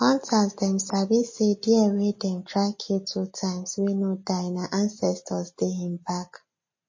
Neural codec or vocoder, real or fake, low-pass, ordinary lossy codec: none; real; 7.2 kHz; MP3, 32 kbps